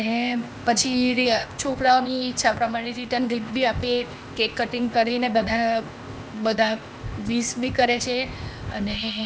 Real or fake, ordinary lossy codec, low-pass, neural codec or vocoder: fake; none; none; codec, 16 kHz, 0.8 kbps, ZipCodec